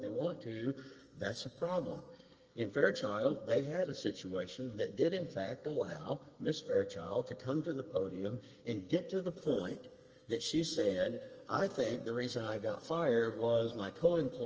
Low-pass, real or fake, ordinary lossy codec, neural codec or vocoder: 7.2 kHz; fake; Opus, 24 kbps; codec, 32 kHz, 1.9 kbps, SNAC